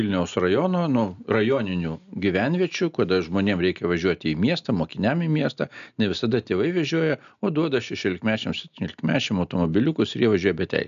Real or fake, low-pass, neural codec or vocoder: real; 7.2 kHz; none